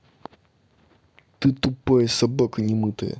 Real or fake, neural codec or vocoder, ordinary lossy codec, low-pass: real; none; none; none